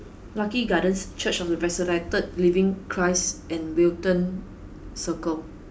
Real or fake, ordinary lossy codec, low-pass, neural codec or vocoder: real; none; none; none